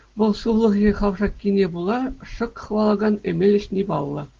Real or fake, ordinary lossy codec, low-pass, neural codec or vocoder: real; Opus, 16 kbps; 7.2 kHz; none